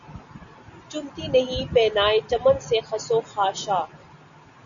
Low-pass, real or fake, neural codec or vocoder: 7.2 kHz; real; none